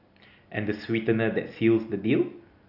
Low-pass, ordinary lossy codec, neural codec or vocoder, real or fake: 5.4 kHz; none; none; real